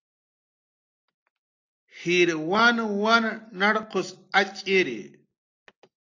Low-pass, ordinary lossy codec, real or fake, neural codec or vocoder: 7.2 kHz; AAC, 48 kbps; real; none